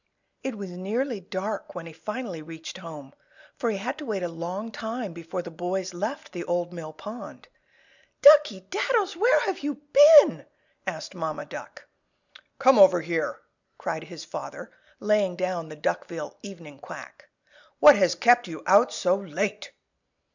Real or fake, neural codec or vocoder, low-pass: real; none; 7.2 kHz